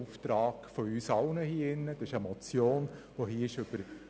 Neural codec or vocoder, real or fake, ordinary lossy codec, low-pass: none; real; none; none